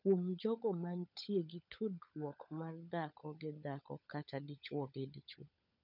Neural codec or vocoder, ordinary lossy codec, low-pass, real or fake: codec, 16 kHz, 16 kbps, FunCodec, trained on LibriTTS, 50 frames a second; none; 5.4 kHz; fake